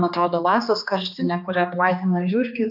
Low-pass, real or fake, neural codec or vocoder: 5.4 kHz; fake; codec, 16 kHz, 2 kbps, X-Codec, HuBERT features, trained on general audio